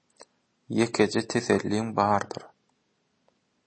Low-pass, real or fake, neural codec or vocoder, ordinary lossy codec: 9.9 kHz; real; none; MP3, 32 kbps